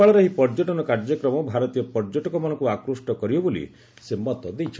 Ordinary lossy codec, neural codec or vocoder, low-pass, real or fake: none; none; none; real